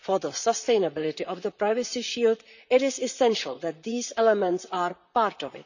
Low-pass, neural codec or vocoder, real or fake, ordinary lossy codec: 7.2 kHz; vocoder, 44.1 kHz, 128 mel bands, Pupu-Vocoder; fake; none